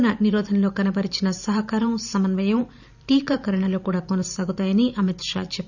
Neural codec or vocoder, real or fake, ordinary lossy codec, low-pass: vocoder, 44.1 kHz, 128 mel bands every 512 samples, BigVGAN v2; fake; none; 7.2 kHz